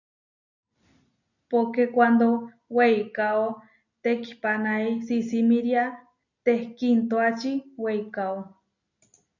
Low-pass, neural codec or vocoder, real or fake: 7.2 kHz; none; real